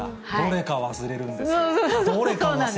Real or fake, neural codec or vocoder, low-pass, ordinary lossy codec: real; none; none; none